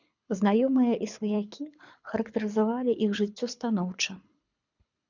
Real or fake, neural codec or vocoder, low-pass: fake; codec, 24 kHz, 6 kbps, HILCodec; 7.2 kHz